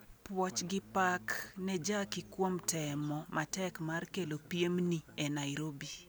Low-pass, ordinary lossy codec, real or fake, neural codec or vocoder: none; none; real; none